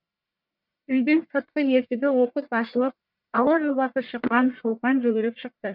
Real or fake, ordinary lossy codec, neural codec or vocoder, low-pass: fake; none; codec, 44.1 kHz, 1.7 kbps, Pupu-Codec; 5.4 kHz